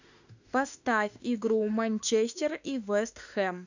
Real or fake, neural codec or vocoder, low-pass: fake; autoencoder, 48 kHz, 32 numbers a frame, DAC-VAE, trained on Japanese speech; 7.2 kHz